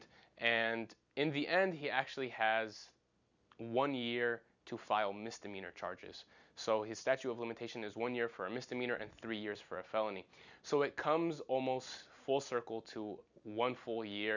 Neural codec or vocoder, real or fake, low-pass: none; real; 7.2 kHz